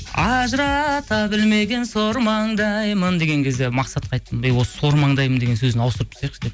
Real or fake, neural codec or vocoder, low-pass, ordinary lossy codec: real; none; none; none